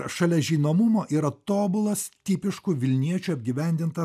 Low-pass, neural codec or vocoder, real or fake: 14.4 kHz; none; real